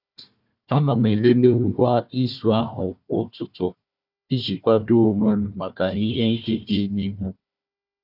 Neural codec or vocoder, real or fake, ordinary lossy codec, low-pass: codec, 16 kHz, 1 kbps, FunCodec, trained on Chinese and English, 50 frames a second; fake; none; 5.4 kHz